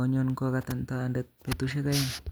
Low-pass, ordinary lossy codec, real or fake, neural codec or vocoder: none; none; real; none